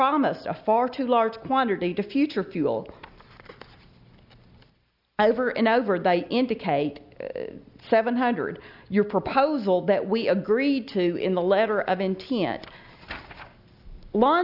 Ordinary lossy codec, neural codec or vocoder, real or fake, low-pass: Opus, 64 kbps; none; real; 5.4 kHz